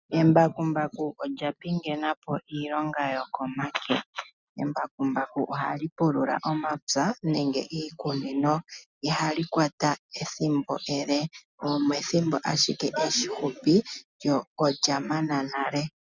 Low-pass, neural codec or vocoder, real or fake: 7.2 kHz; none; real